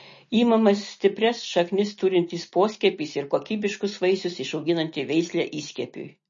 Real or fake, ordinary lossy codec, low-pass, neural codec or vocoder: real; MP3, 32 kbps; 7.2 kHz; none